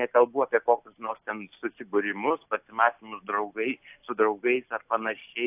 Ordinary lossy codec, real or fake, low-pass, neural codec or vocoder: AAC, 32 kbps; real; 3.6 kHz; none